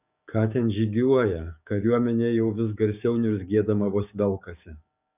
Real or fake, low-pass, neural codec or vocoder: fake; 3.6 kHz; codec, 16 kHz, 6 kbps, DAC